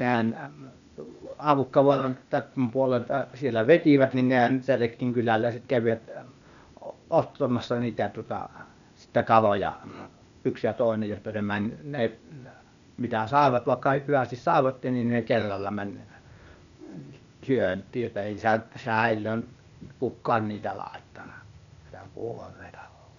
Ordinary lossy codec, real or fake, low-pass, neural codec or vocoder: none; fake; 7.2 kHz; codec, 16 kHz, 0.8 kbps, ZipCodec